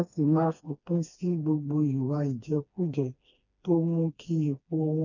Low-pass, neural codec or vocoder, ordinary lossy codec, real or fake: 7.2 kHz; codec, 16 kHz, 2 kbps, FreqCodec, smaller model; none; fake